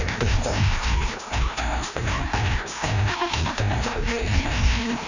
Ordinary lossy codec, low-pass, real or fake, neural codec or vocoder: none; 7.2 kHz; fake; codec, 16 kHz, 1 kbps, FreqCodec, larger model